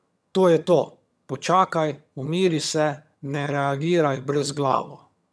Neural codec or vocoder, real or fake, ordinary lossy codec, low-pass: vocoder, 22.05 kHz, 80 mel bands, HiFi-GAN; fake; none; none